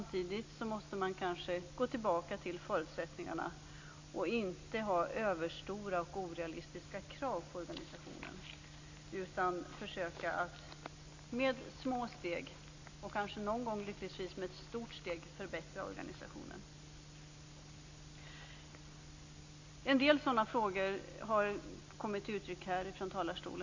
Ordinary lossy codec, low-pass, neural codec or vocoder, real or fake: AAC, 48 kbps; 7.2 kHz; none; real